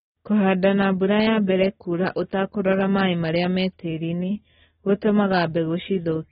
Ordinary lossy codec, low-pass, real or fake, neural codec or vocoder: AAC, 16 kbps; 19.8 kHz; real; none